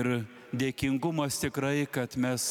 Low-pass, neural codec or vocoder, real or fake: 19.8 kHz; none; real